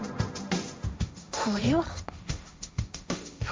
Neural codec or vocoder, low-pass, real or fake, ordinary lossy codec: codec, 16 kHz, 1.1 kbps, Voila-Tokenizer; none; fake; none